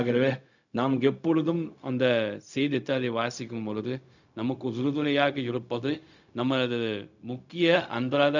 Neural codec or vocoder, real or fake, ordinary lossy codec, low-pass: codec, 16 kHz, 0.4 kbps, LongCat-Audio-Codec; fake; none; 7.2 kHz